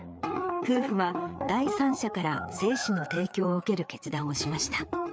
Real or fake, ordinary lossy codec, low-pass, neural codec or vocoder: fake; none; none; codec, 16 kHz, 4 kbps, FreqCodec, larger model